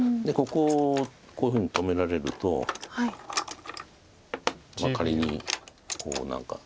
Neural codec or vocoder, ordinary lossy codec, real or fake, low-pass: none; none; real; none